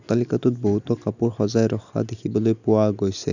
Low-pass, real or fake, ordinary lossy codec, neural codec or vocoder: 7.2 kHz; real; none; none